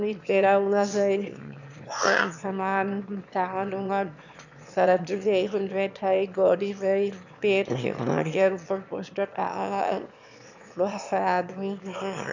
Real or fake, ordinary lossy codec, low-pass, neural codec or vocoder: fake; none; 7.2 kHz; autoencoder, 22.05 kHz, a latent of 192 numbers a frame, VITS, trained on one speaker